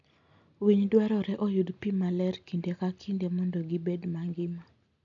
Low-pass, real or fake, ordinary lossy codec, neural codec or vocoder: 7.2 kHz; real; none; none